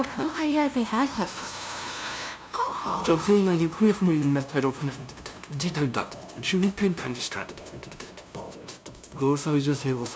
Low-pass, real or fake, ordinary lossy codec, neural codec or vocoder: none; fake; none; codec, 16 kHz, 0.5 kbps, FunCodec, trained on LibriTTS, 25 frames a second